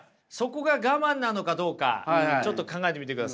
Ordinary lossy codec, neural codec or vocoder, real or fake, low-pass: none; none; real; none